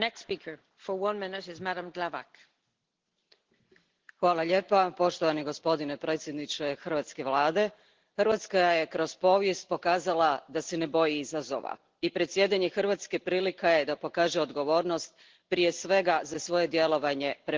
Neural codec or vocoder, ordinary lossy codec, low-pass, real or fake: none; Opus, 16 kbps; 7.2 kHz; real